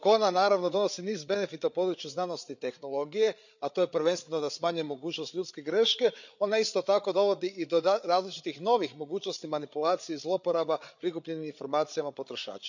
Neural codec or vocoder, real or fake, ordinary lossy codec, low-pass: codec, 16 kHz, 8 kbps, FreqCodec, larger model; fake; none; 7.2 kHz